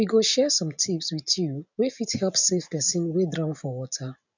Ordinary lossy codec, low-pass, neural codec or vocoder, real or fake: none; 7.2 kHz; none; real